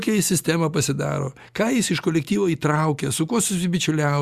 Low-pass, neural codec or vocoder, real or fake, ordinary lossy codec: 14.4 kHz; none; real; Opus, 64 kbps